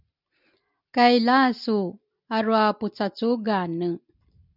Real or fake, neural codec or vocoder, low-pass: real; none; 5.4 kHz